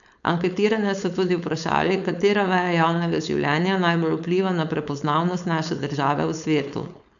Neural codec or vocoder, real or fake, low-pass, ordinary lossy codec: codec, 16 kHz, 4.8 kbps, FACodec; fake; 7.2 kHz; none